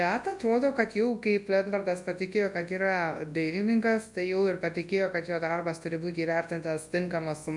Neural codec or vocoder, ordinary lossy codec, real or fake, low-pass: codec, 24 kHz, 0.9 kbps, WavTokenizer, large speech release; MP3, 64 kbps; fake; 10.8 kHz